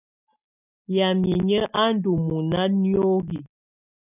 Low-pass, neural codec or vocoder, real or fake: 3.6 kHz; none; real